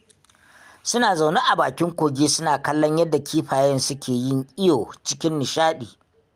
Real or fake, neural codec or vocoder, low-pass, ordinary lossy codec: real; none; 14.4 kHz; Opus, 32 kbps